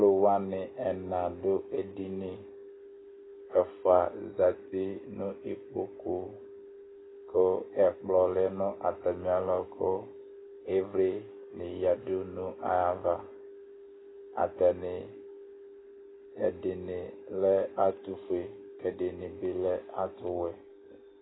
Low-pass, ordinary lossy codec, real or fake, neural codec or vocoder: 7.2 kHz; AAC, 16 kbps; real; none